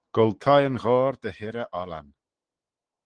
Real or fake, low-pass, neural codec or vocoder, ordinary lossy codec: fake; 9.9 kHz; autoencoder, 48 kHz, 128 numbers a frame, DAC-VAE, trained on Japanese speech; Opus, 16 kbps